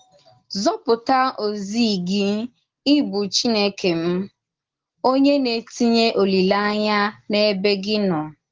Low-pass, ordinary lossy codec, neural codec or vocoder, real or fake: 7.2 kHz; Opus, 16 kbps; none; real